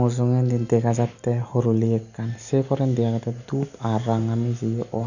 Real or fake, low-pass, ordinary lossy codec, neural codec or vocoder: real; 7.2 kHz; none; none